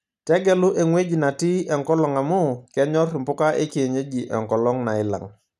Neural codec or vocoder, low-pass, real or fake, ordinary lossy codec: none; 14.4 kHz; real; none